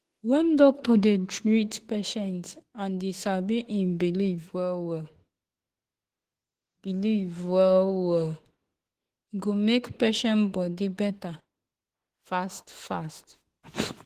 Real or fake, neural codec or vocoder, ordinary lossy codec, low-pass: fake; autoencoder, 48 kHz, 32 numbers a frame, DAC-VAE, trained on Japanese speech; Opus, 16 kbps; 14.4 kHz